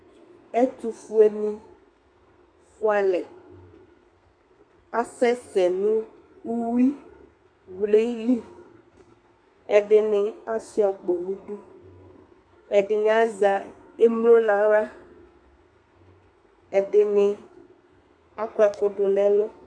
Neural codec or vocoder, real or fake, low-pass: codec, 32 kHz, 1.9 kbps, SNAC; fake; 9.9 kHz